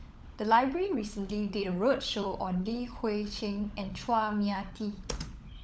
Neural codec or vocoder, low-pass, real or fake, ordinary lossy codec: codec, 16 kHz, 16 kbps, FunCodec, trained on LibriTTS, 50 frames a second; none; fake; none